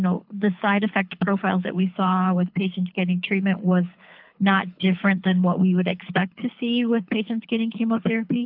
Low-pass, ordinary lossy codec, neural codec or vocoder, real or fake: 5.4 kHz; AAC, 48 kbps; codec, 24 kHz, 6 kbps, HILCodec; fake